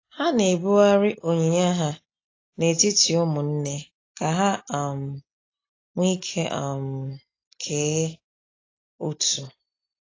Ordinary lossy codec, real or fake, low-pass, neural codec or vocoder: AAC, 32 kbps; real; 7.2 kHz; none